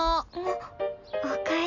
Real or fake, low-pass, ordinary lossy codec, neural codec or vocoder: real; 7.2 kHz; none; none